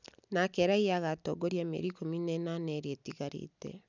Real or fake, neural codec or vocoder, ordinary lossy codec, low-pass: real; none; none; 7.2 kHz